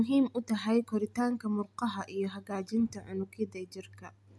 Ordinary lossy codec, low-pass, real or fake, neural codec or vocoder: none; none; real; none